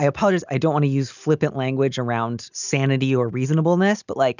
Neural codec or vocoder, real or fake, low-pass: none; real; 7.2 kHz